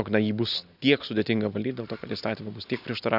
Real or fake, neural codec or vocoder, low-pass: real; none; 5.4 kHz